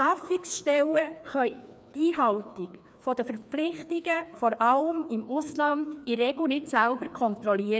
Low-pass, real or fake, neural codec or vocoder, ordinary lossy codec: none; fake; codec, 16 kHz, 2 kbps, FreqCodec, larger model; none